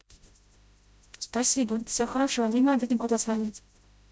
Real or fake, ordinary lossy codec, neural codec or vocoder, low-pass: fake; none; codec, 16 kHz, 0.5 kbps, FreqCodec, smaller model; none